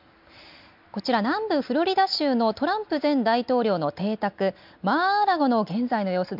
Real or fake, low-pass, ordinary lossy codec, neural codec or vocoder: real; 5.4 kHz; MP3, 48 kbps; none